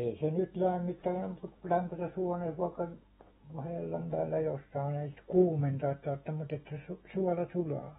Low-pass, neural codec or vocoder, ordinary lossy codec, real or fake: 19.8 kHz; autoencoder, 48 kHz, 128 numbers a frame, DAC-VAE, trained on Japanese speech; AAC, 16 kbps; fake